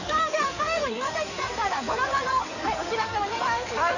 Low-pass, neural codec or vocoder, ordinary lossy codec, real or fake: 7.2 kHz; codec, 16 kHz in and 24 kHz out, 2.2 kbps, FireRedTTS-2 codec; none; fake